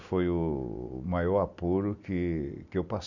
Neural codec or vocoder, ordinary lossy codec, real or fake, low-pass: none; none; real; 7.2 kHz